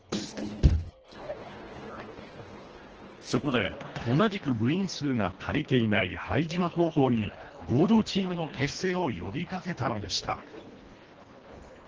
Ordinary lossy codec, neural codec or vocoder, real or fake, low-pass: Opus, 16 kbps; codec, 24 kHz, 1.5 kbps, HILCodec; fake; 7.2 kHz